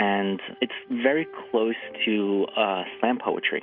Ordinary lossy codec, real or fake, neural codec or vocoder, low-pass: Opus, 64 kbps; real; none; 5.4 kHz